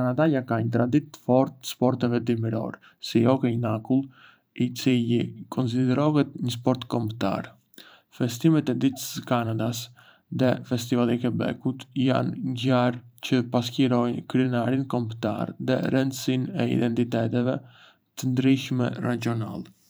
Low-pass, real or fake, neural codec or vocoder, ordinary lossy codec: none; fake; vocoder, 44.1 kHz, 128 mel bands every 512 samples, BigVGAN v2; none